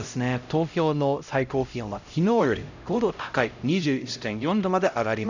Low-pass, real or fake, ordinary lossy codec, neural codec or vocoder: 7.2 kHz; fake; none; codec, 16 kHz, 0.5 kbps, X-Codec, HuBERT features, trained on LibriSpeech